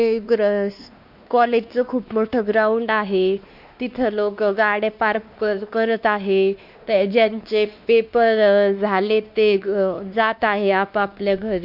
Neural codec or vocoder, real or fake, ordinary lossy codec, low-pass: codec, 16 kHz, 2 kbps, X-Codec, WavLM features, trained on Multilingual LibriSpeech; fake; none; 5.4 kHz